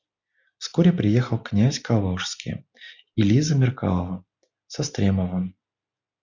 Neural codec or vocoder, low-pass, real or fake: none; 7.2 kHz; real